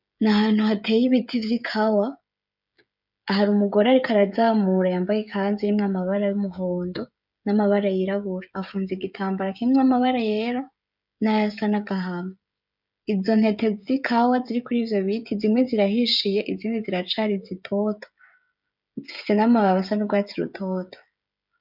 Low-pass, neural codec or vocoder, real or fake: 5.4 kHz; codec, 16 kHz, 16 kbps, FreqCodec, smaller model; fake